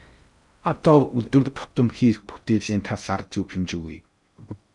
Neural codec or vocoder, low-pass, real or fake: codec, 16 kHz in and 24 kHz out, 0.6 kbps, FocalCodec, streaming, 4096 codes; 10.8 kHz; fake